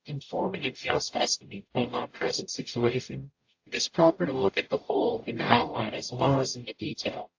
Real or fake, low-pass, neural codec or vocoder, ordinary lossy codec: fake; 7.2 kHz; codec, 44.1 kHz, 0.9 kbps, DAC; AAC, 48 kbps